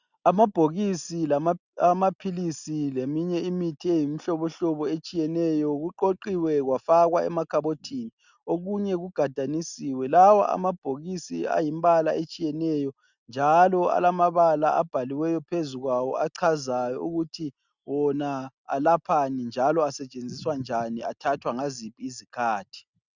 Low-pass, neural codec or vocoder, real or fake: 7.2 kHz; none; real